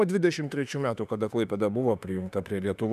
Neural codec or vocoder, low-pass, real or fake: autoencoder, 48 kHz, 32 numbers a frame, DAC-VAE, trained on Japanese speech; 14.4 kHz; fake